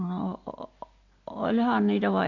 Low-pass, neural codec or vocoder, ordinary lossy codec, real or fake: 7.2 kHz; none; AAC, 48 kbps; real